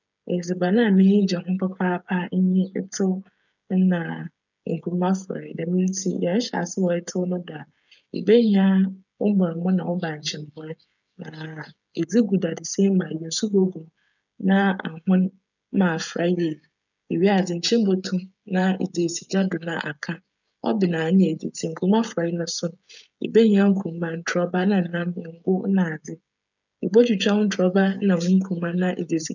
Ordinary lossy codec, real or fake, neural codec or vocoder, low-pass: none; fake; codec, 16 kHz, 16 kbps, FreqCodec, smaller model; 7.2 kHz